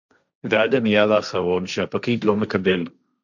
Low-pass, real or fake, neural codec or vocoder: 7.2 kHz; fake; codec, 16 kHz, 1.1 kbps, Voila-Tokenizer